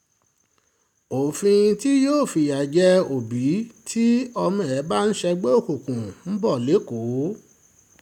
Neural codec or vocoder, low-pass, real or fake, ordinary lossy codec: none; 19.8 kHz; real; none